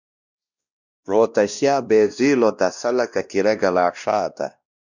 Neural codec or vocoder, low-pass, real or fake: codec, 16 kHz, 1 kbps, X-Codec, WavLM features, trained on Multilingual LibriSpeech; 7.2 kHz; fake